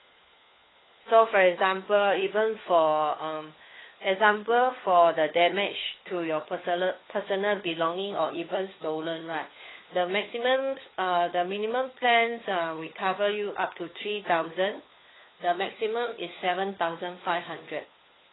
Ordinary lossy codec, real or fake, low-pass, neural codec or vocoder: AAC, 16 kbps; fake; 7.2 kHz; codec, 16 kHz, 4 kbps, FunCodec, trained on LibriTTS, 50 frames a second